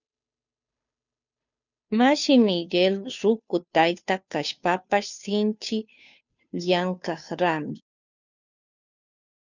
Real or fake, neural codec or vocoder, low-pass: fake; codec, 16 kHz, 2 kbps, FunCodec, trained on Chinese and English, 25 frames a second; 7.2 kHz